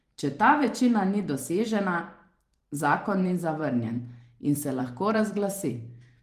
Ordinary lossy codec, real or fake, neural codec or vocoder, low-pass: Opus, 24 kbps; fake; vocoder, 44.1 kHz, 128 mel bands every 512 samples, BigVGAN v2; 14.4 kHz